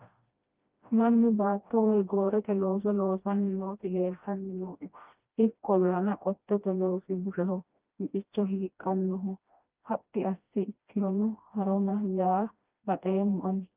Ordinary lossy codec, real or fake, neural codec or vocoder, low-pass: Opus, 24 kbps; fake; codec, 16 kHz, 1 kbps, FreqCodec, smaller model; 3.6 kHz